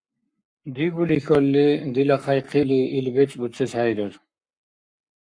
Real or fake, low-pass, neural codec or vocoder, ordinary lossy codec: fake; 9.9 kHz; codec, 44.1 kHz, 7.8 kbps, Pupu-Codec; Opus, 64 kbps